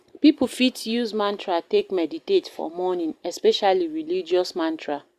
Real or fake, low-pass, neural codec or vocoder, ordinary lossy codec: real; 14.4 kHz; none; Opus, 64 kbps